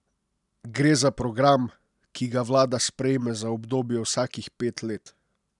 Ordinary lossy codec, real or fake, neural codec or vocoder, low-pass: none; real; none; 10.8 kHz